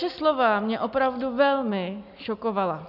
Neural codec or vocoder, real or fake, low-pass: none; real; 5.4 kHz